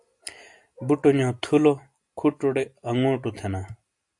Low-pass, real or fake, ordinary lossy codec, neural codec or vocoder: 10.8 kHz; real; AAC, 64 kbps; none